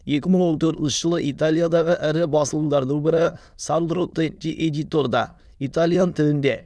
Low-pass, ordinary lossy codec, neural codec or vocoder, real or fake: none; none; autoencoder, 22.05 kHz, a latent of 192 numbers a frame, VITS, trained on many speakers; fake